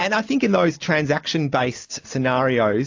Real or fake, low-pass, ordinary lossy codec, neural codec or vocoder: real; 7.2 kHz; AAC, 48 kbps; none